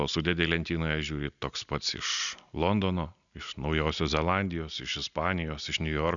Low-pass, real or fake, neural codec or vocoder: 7.2 kHz; real; none